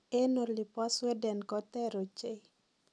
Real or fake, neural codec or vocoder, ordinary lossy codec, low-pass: real; none; none; none